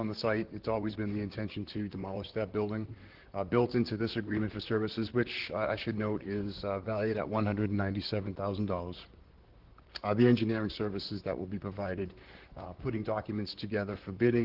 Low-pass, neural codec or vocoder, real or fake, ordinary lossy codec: 5.4 kHz; vocoder, 44.1 kHz, 128 mel bands, Pupu-Vocoder; fake; Opus, 16 kbps